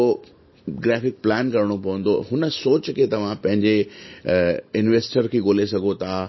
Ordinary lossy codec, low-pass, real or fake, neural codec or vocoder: MP3, 24 kbps; 7.2 kHz; real; none